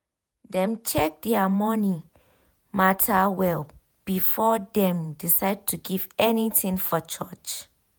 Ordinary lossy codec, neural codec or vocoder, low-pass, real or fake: none; vocoder, 48 kHz, 128 mel bands, Vocos; none; fake